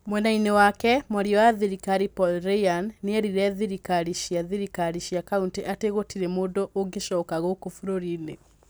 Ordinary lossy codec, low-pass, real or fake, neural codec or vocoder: none; none; real; none